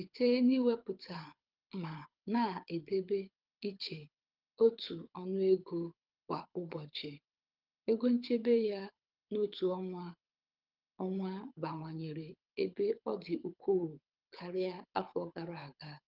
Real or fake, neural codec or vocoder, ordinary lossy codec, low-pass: fake; codec, 24 kHz, 6 kbps, HILCodec; Opus, 24 kbps; 5.4 kHz